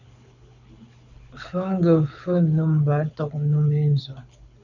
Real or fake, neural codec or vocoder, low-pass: fake; codec, 24 kHz, 6 kbps, HILCodec; 7.2 kHz